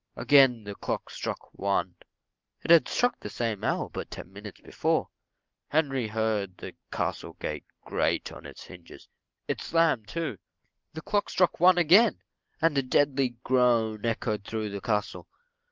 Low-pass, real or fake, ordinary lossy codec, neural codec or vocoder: 7.2 kHz; real; Opus, 32 kbps; none